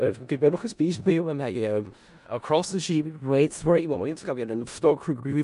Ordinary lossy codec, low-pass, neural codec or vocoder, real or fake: none; 10.8 kHz; codec, 16 kHz in and 24 kHz out, 0.4 kbps, LongCat-Audio-Codec, four codebook decoder; fake